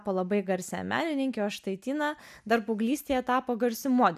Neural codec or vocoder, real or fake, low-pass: none; real; 14.4 kHz